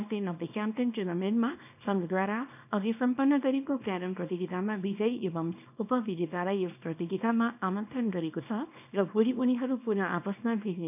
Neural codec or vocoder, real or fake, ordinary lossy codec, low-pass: codec, 24 kHz, 0.9 kbps, WavTokenizer, small release; fake; none; 3.6 kHz